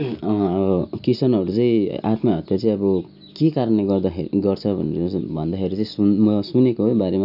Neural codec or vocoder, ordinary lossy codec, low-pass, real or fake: none; none; 5.4 kHz; real